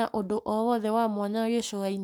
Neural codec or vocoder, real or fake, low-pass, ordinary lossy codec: codec, 44.1 kHz, 7.8 kbps, Pupu-Codec; fake; none; none